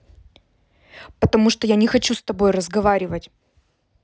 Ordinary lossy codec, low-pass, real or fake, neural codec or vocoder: none; none; real; none